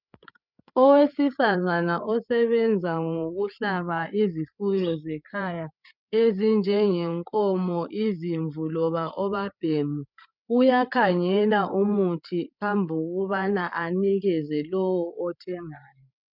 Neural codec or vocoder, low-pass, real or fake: codec, 16 kHz, 8 kbps, FreqCodec, larger model; 5.4 kHz; fake